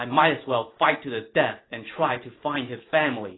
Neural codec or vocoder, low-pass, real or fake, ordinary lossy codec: none; 7.2 kHz; real; AAC, 16 kbps